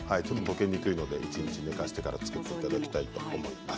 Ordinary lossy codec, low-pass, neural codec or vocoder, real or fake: none; none; none; real